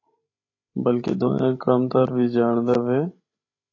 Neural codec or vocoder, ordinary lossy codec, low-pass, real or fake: codec, 16 kHz, 16 kbps, FreqCodec, larger model; AAC, 32 kbps; 7.2 kHz; fake